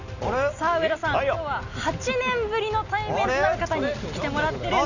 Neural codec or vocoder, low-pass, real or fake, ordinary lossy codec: none; 7.2 kHz; real; none